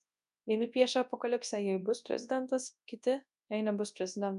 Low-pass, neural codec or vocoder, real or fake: 10.8 kHz; codec, 24 kHz, 0.9 kbps, WavTokenizer, large speech release; fake